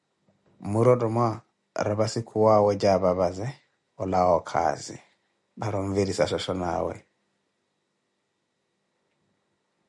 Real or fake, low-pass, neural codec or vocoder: real; 10.8 kHz; none